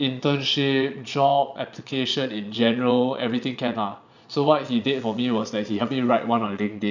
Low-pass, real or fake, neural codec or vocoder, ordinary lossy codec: 7.2 kHz; fake; vocoder, 22.05 kHz, 80 mel bands, Vocos; none